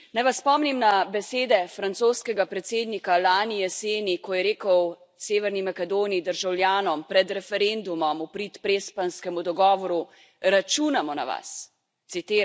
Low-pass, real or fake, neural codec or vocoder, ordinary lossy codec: none; real; none; none